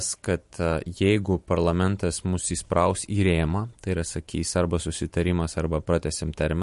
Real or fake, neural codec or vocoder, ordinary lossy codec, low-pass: fake; vocoder, 44.1 kHz, 128 mel bands every 512 samples, BigVGAN v2; MP3, 48 kbps; 14.4 kHz